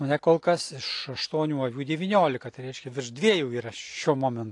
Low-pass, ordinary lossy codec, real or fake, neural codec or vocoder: 10.8 kHz; AAC, 48 kbps; real; none